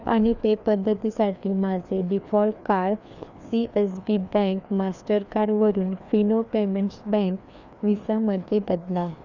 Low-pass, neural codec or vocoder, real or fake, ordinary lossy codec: 7.2 kHz; codec, 16 kHz, 2 kbps, FreqCodec, larger model; fake; none